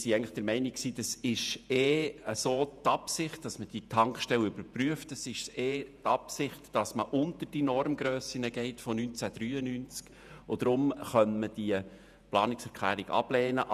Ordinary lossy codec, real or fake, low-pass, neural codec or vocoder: none; fake; 14.4 kHz; vocoder, 48 kHz, 128 mel bands, Vocos